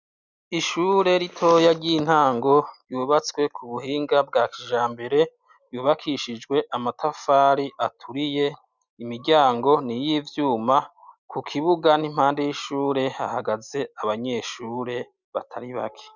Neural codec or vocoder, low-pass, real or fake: none; 7.2 kHz; real